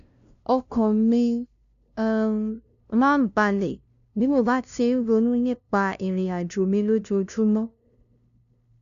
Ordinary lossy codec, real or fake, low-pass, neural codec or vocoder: none; fake; 7.2 kHz; codec, 16 kHz, 0.5 kbps, FunCodec, trained on LibriTTS, 25 frames a second